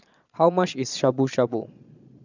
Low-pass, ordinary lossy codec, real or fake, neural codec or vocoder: 7.2 kHz; none; fake; vocoder, 22.05 kHz, 80 mel bands, Vocos